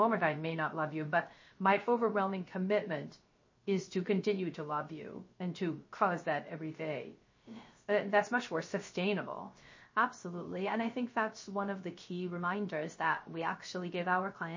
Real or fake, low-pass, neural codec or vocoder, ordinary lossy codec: fake; 7.2 kHz; codec, 16 kHz, 0.3 kbps, FocalCodec; MP3, 32 kbps